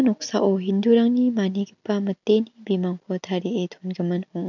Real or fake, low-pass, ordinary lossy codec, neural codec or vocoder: real; 7.2 kHz; none; none